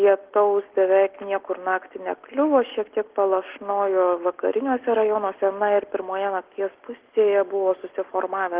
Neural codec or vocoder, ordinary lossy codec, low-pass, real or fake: none; Opus, 16 kbps; 3.6 kHz; real